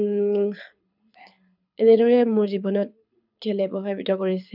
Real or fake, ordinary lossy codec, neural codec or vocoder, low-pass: fake; none; codec, 24 kHz, 6 kbps, HILCodec; 5.4 kHz